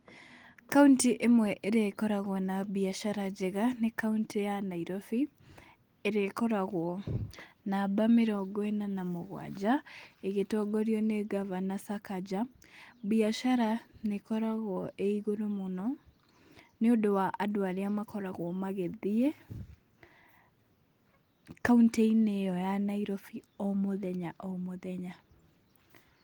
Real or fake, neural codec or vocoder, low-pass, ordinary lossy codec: real; none; 19.8 kHz; Opus, 24 kbps